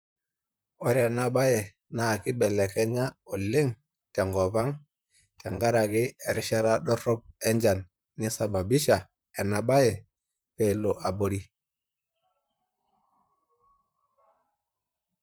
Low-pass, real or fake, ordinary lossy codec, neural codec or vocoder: none; fake; none; vocoder, 44.1 kHz, 128 mel bands, Pupu-Vocoder